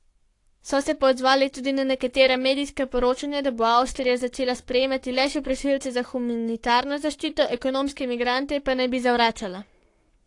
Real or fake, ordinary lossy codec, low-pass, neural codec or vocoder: fake; AAC, 48 kbps; 10.8 kHz; codec, 44.1 kHz, 7.8 kbps, Pupu-Codec